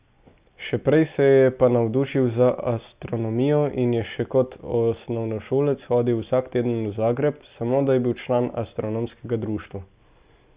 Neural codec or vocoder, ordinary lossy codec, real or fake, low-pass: none; Opus, 64 kbps; real; 3.6 kHz